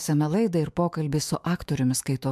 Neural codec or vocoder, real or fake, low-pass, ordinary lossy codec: autoencoder, 48 kHz, 128 numbers a frame, DAC-VAE, trained on Japanese speech; fake; 14.4 kHz; MP3, 96 kbps